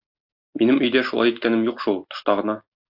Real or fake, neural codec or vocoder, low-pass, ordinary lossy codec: real; none; 5.4 kHz; AAC, 48 kbps